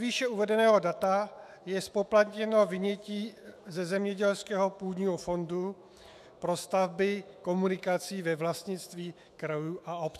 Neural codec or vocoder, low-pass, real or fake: autoencoder, 48 kHz, 128 numbers a frame, DAC-VAE, trained on Japanese speech; 14.4 kHz; fake